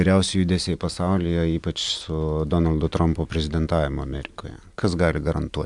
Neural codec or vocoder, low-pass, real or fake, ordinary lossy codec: none; 10.8 kHz; real; MP3, 96 kbps